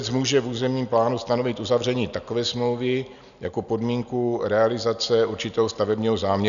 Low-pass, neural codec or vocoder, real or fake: 7.2 kHz; none; real